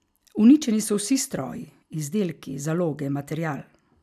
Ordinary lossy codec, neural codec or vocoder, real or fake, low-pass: none; none; real; 14.4 kHz